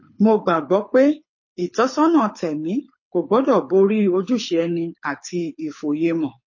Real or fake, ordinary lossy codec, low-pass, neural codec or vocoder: fake; MP3, 32 kbps; 7.2 kHz; codec, 24 kHz, 6 kbps, HILCodec